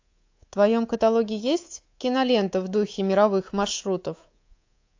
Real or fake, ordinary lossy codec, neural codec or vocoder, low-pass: fake; AAC, 48 kbps; codec, 24 kHz, 3.1 kbps, DualCodec; 7.2 kHz